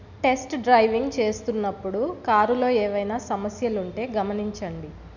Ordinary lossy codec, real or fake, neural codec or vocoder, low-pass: none; real; none; 7.2 kHz